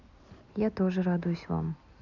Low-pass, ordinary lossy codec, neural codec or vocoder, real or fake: 7.2 kHz; none; none; real